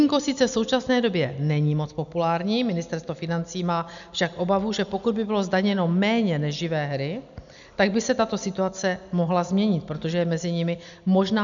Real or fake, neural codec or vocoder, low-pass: real; none; 7.2 kHz